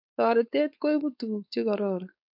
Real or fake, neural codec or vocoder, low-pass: fake; codec, 16 kHz, 4.8 kbps, FACodec; 5.4 kHz